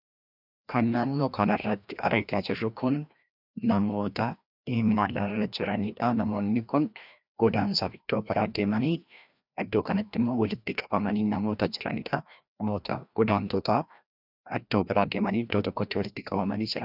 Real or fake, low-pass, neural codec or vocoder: fake; 5.4 kHz; codec, 16 kHz, 1 kbps, FreqCodec, larger model